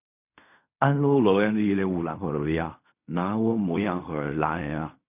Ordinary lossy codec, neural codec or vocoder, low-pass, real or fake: none; codec, 16 kHz in and 24 kHz out, 0.4 kbps, LongCat-Audio-Codec, fine tuned four codebook decoder; 3.6 kHz; fake